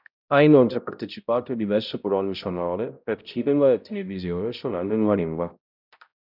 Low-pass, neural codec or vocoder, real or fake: 5.4 kHz; codec, 16 kHz, 0.5 kbps, X-Codec, HuBERT features, trained on balanced general audio; fake